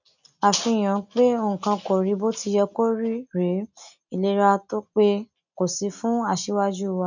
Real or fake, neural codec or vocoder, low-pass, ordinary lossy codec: real; none; 7.2 kHz; none